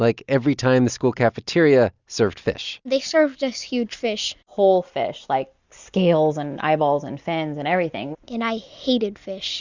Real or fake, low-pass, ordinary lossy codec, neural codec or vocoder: real; 7.2 kHz; Opus, 64 kbps; none